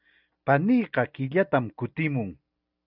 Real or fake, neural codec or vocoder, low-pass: real; none; 5.4 kHz